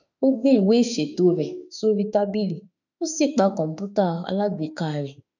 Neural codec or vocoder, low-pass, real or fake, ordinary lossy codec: autoencoder, 48 kHz, 32 numbers a frame, DAC-VAE, trained on Japanese speech; 7.2 kHz; fake; none